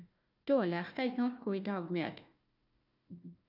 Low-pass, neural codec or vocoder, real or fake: 5.4 kHz; codec, 16 kHz, 1 kbps, FunCodec, trained on Chinese and English, 50 frames a second; fake